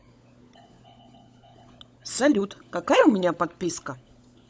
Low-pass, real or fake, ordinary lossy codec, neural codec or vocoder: none; fake; none; codec, 16 kHz, 16 kbps, FunCodec, trained on LibriTTS, 50 frames a second